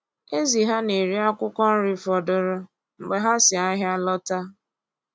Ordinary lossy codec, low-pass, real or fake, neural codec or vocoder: none; none; real; none